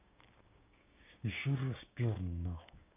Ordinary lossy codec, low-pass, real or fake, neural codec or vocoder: none; 3.6 kHz; fake; codec, 16 kHz, 6 kbps, DAC